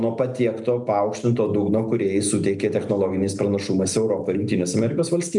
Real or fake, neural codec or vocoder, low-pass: real; none; 10.8 kHz